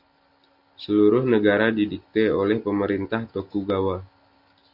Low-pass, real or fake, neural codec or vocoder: 5.4 kHz; real; none